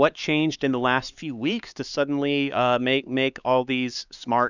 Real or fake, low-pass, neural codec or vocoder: fake; 7.2 kHz; codec, 16 kHz, 4 kbps, X-Codec, HuBERT features, trained on LibriSpeech